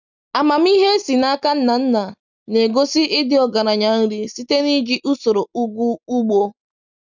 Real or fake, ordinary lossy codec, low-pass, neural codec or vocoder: real; none; 7.2 kHz; none